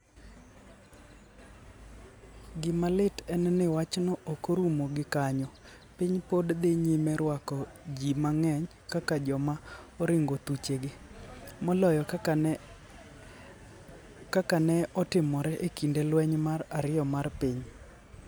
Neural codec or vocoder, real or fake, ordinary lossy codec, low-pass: none; real; none; none